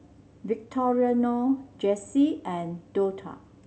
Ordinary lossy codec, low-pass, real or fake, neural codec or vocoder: none; none; real; none